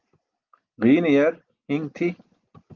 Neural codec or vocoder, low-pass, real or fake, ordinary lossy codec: none; 7.2 kHz; real; Opus, 24 kbps